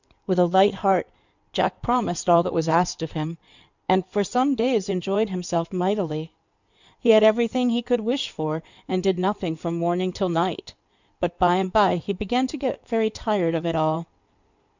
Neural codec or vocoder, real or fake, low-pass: codec, 16 kHz in and 24 kHz out, 2.2 kbps, FireRedTTS-2 codec; fake; 7.2 kHz